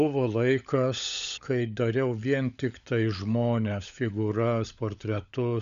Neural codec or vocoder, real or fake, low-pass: codec, 16 kHz, 16 kbps, FunCodec, trained on LibriTTS, 50 frames a second; fake; 7.2 kHz